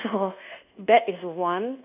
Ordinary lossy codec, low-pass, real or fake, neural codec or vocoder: none; 3.6 kHz; fake; codec, 24 kHz, 1.2 kbps, DualCodec